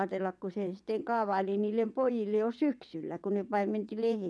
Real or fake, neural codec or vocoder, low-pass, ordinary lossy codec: fake; vocoder, 22.05 kHz, 80 mel bands, WaveNeXt; none; none